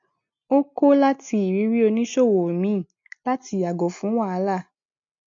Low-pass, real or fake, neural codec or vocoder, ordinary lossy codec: 7.2 kHz; real; none; MP3, 48 kbps